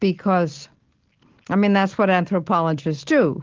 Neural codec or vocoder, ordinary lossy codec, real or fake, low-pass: none; Opus, 16 kbps; real; 7.2 kHz